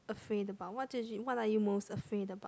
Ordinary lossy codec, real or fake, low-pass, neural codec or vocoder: none; real; none; none